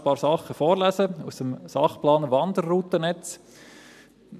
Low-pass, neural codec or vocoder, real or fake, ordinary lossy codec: 14.4 kHz; none; real; none